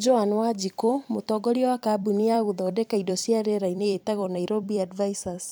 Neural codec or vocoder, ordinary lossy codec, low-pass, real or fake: vocoder, 44.1 kHz, 128 mel bands every 256 samples, BigVGAN v2; none; none; fake